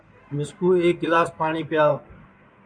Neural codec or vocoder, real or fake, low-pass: codec, 16 kHz in and 24 kHz out, 2.2 kbps, FireRedTTS-2 codec; fake; 9.9 kHz